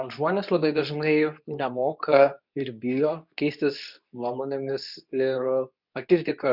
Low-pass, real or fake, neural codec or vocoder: 5.4 kHz; fake; codec, 24 kHz, 0.9 kbps, WavTokenizer, medium speech release version 2